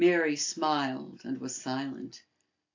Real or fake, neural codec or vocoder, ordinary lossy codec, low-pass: real; none; AAC, 48 kbps; 7.2 kHz